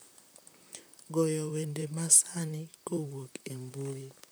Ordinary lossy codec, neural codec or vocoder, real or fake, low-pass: none; vocoder, 44.1 kHz, 128 mel bands, Pupu-Vocoder; fake; none